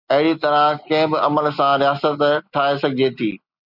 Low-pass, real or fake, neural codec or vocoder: 5.4 kHz; real; none